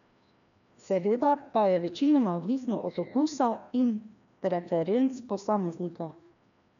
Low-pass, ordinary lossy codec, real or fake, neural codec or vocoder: 7.2 kHz; MP3, 96 kbps; fake; codec, 16 kHz, 1 kbps, FreqCodec, larger model